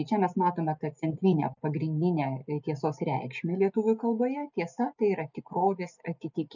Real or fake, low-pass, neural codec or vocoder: real; 7.2 kHz; none